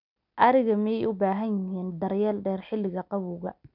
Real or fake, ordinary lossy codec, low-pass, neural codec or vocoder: real; none; 5.4 kHz; none